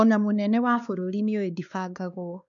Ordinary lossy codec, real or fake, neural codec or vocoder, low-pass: none; fake; codec, 16 kHz, 2 kbps, X-Codec, WavLM features, trained on Multilingual LibriSpeech; 7.2 kHz